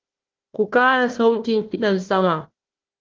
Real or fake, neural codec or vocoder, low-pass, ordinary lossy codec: fake; codec, 16 kHz, 1 kbps, FunCodec, trained on Chinese and English, 50 frames a second; 7.2 kHz; Opus, 16 kbps